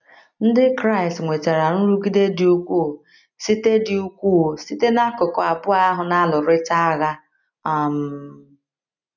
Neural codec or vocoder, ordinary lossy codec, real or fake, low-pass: none; none; real; 7.2 kHz